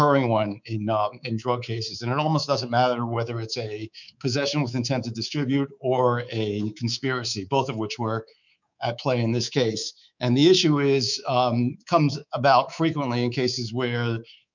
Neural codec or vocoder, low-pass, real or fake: codec, 24 kHz, 3.1 kbps, DualCodec; 7.2 kHz; fake